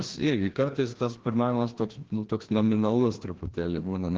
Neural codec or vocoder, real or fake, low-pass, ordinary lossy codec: codec, 16 kHz, 1 kbps, FreqCodec, larger model; fake; 7.2 kHz; Opus, 16 kbps